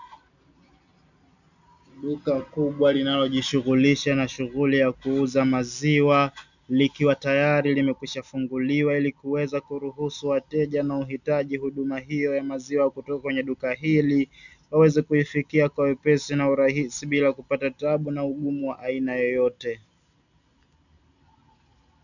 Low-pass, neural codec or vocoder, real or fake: 7.2 kHz; none; real